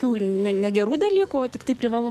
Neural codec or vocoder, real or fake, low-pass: codec, 44.1 kHz, 2.6 kbps, SNAC; fake; 14.4 kHz